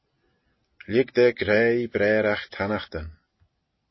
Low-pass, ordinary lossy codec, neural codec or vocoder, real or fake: 7.2 kHz; MP3, 24 kbps; none; real